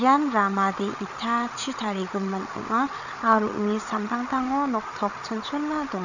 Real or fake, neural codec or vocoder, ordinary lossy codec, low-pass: fake; codec, 16 kHz, 8 kbps, FreqCodec, larger model; none; 7.2 kHz